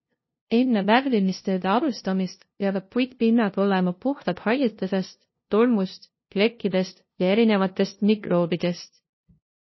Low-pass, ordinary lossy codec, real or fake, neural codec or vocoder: 7.2 kHz; MP3, 24 kbps; fake; codec, 16 kHz, 0.5 kbps, FunCodec, trained on LibriTTS, 25 frames a second